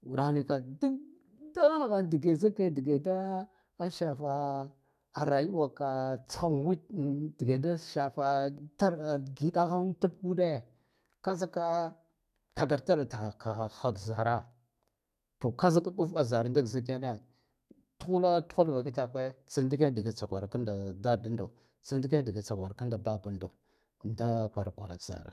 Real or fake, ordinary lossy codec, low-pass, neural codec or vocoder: fake; none; 14.4 kHz; codec, 32 kHz, 1.9 kbps, SNAC